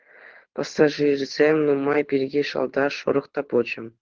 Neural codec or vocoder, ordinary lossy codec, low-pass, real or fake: codec, 24 kHz, 6 kbps, HILCodec; Opus, 16 kbps; 7.2 kHz; fake